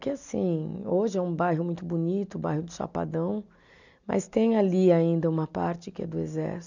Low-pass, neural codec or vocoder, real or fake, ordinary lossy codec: 7.2 kHz; none; real; none